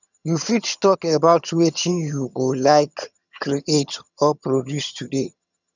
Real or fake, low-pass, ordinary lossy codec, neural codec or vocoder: fake; 7.2 kHz; none; vocoder, 22.05 kHz, 80 mel bands, HiFi-GAN